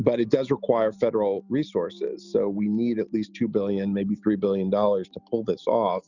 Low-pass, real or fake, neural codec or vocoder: 7.2 kHz; real; none